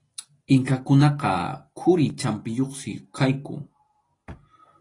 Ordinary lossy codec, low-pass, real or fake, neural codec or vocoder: AAC, 32 kbps; 10.8 kHz; real; none